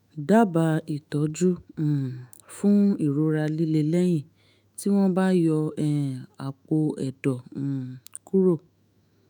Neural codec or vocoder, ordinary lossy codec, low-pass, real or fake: autoencoder, 48 kHz, 128 numbers a frame, DAC-VAE, trained on Japanese speech; none; none; fake